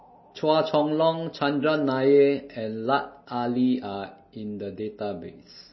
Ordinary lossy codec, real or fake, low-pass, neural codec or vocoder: MP3, 24 kbps; real; 7.2 kHz; none